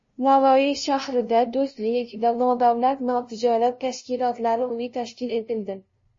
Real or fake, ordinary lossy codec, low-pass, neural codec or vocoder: fake; MP3, 32 kbps; 7.2 kHz; codec, 16 kHz, 0.5 kbps, FunCodec, trained on LibriTTS, 25 frames a second